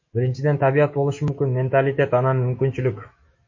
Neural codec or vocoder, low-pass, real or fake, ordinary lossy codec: autoencoder, 48 kHz, 128 numbers a frame, DAC-VAE, trained on Japanese speech; 7.2 kHz; fake; MP3, 32 kbps